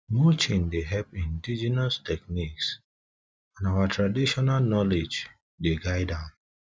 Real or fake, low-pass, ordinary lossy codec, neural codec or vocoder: real; none; none; none